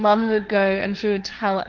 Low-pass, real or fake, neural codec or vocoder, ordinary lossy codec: 7.2 kHz; fake; codec, 16 kHz, 1.1 kbps, Voila-Tokenizer; Opus, 24 kbps